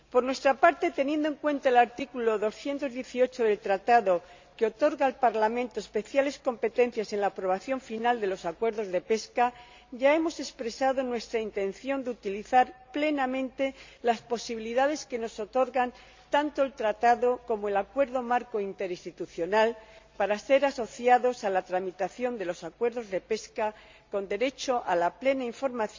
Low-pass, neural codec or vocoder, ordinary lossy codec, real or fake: 7.2 kHz; none; MP3, 64 kbps; real